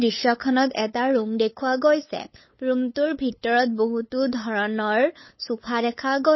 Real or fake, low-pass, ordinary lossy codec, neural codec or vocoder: fake; 7.2 kHz; MP3, 24 kbps; codec, 16 kHz, 8 kbps, FunCodec, trained on LibriTTS, 25 frames a second